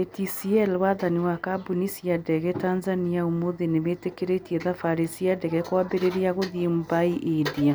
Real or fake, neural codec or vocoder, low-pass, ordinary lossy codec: real; none; none; none